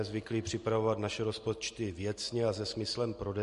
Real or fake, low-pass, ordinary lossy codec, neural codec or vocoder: real; 14.4 kHz; MP3, 48 kbps; none